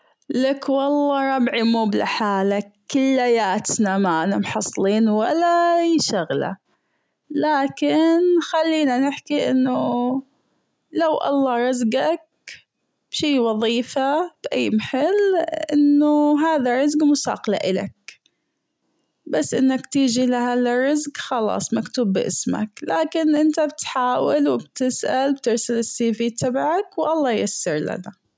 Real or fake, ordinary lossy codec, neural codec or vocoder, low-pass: real; none; none; none